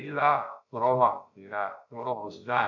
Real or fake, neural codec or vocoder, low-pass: fake; codec, 16 kHz, about 1 kbps, DyCAST, with the encoder's durations; 7.2 kHz